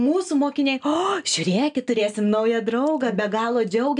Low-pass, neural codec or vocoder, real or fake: 9.9 kHz; none; real